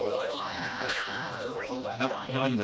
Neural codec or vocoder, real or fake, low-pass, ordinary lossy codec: codec, 16 kHz, 1 kbps, FreqCodec, smaller model; fake; none; none